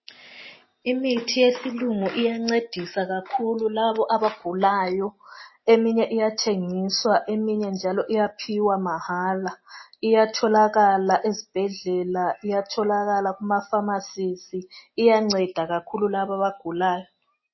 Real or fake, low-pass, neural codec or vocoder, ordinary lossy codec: real; 7.2 kHz; none; MP3, 24 kbps